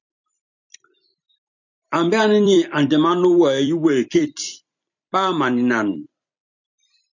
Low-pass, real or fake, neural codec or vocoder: 7.2 kHz; fake; vocoder, 44.1 kHz, 128 mel bands every 256 samples, BigVGAN v2